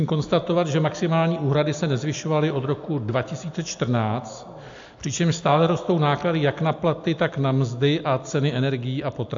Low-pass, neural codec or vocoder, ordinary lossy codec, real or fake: 7.2 kHz; none; AAC, 64 kbps; real